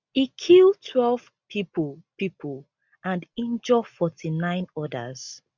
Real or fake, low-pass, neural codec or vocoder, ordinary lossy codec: real; 7.2 kHz; none; none